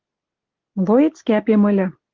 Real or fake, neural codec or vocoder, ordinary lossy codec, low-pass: real; none; Opus, 16 kbps; 7.2 kHz